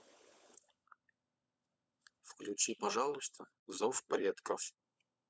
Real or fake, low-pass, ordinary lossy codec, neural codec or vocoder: fake; none; none; codec, 16 kHz, 16 kbps, FunCodec, trained on LibriTTS, 50 frames a second